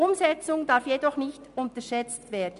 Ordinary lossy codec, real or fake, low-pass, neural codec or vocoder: none; real; 10.8 kHz; none